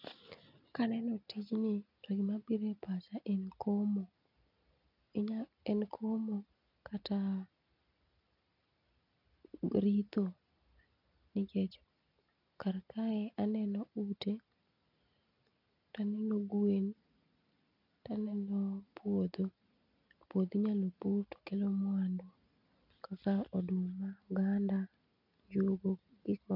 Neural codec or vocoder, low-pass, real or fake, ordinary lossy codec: none; 5.4 kHz; real; none